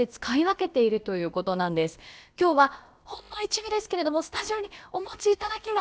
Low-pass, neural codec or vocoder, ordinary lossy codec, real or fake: none; codec, 16 kHz, about 1 kbps, DyCAST, with the encoder's durations; none; fake